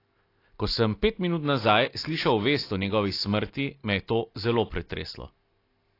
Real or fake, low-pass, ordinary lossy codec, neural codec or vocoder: real; 5.4 kHz; AAC, 32 kbps; none